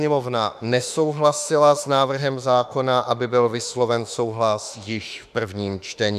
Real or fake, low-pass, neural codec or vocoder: fake; 14.4 kHz; autoencoder, 48 kHz, 32 numbers a frame, DAC-VAE, trained on Japanese speech